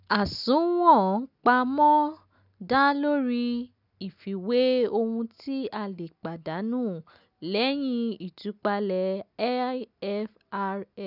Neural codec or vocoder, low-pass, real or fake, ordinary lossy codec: none; 5.4 kHz; real; none